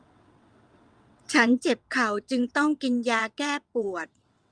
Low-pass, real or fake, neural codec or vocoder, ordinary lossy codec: 9.9 kHz; fake; vocoder, 22.05 kHz, 80 mel bands, WaveNeXt; Opus, 32 kbps